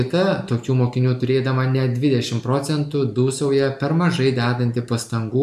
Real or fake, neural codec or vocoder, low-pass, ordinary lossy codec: real; none; 14.4 kHz; AAC, 96 kbps